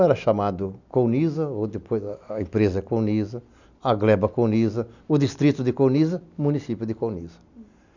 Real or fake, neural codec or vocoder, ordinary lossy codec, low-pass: real; none; none; 7.2 kHz